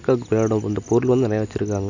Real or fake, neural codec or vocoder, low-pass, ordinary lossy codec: real; none; 7.2 kHz; none